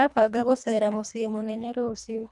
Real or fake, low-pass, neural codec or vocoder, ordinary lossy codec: fake; 10.8 kHz; codec, 24 kHz, 1.5 kbps, HILCodec; none